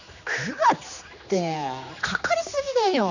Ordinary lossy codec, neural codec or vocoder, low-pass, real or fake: none; codec, 16 kHz, 2 kbps, X-Codec, HuBERT features, trained on general audio; 7.2 kHz; fake